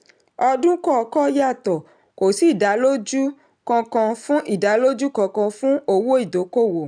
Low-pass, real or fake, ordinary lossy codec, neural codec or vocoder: 9.9 kHz; real; none; none